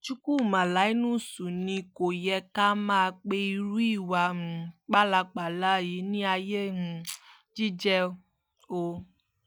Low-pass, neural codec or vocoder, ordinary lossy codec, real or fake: none; none; none; real